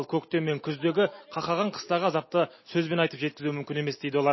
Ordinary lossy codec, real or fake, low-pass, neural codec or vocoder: MP3, 24 kbps; real; 7.2 kHz; none